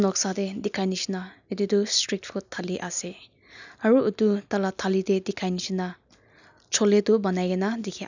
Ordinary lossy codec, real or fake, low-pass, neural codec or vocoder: none; real; 7.2 kHz; none